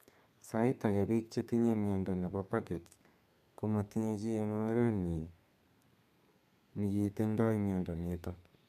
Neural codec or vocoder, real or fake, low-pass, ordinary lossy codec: codec, 32 kHz, 1.9 kbps, SNAC; fake; 14.4 kHz; none